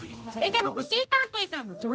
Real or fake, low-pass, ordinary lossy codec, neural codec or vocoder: fake; none; none; codec, 16 kHz, 0.5 kbps, X-Codec, HuBERT features, trained on general audio